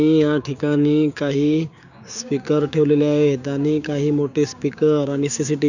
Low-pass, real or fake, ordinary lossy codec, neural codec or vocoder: 7.2 kHz; fake; none; codec, 16 kHz, 6 kbps, DAC